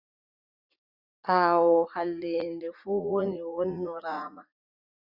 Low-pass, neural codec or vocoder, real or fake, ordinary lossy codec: 5.4 kHz; vocoder, 44.1 kHz, 80 mel bands, Vocos; fake; Opus, 64 kbps